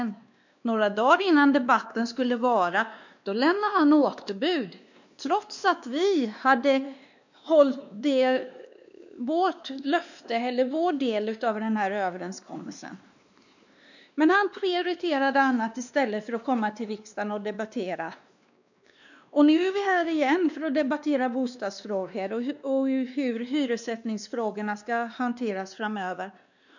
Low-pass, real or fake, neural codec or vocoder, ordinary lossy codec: 7.2 kHz; fake; codec, 16 kHz, 2 kbps, X-Codec, WavLM features, trained on Multilingual LibriSpeech; none